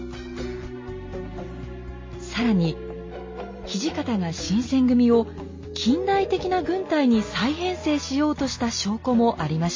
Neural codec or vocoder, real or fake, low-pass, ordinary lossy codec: none; real; 7.2 kHz; MP3, 32 kbps